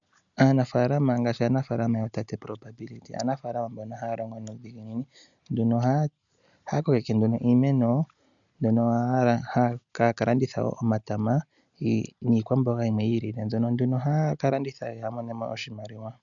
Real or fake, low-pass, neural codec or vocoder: real; 7.2 kHz; none